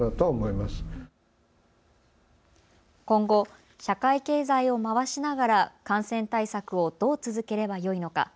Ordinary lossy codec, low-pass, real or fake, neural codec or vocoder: none; none; real; none